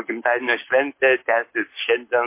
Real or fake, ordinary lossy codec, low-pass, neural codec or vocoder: fake; MP3, 24 kbps; 3.6 kHz; codec, 44.1 kHz, 7.8 kbps, Pupu-Codec